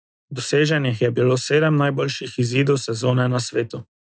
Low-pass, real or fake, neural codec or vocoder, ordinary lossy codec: none; real; none; none